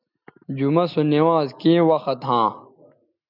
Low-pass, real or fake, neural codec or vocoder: 5.4 kHz; real; none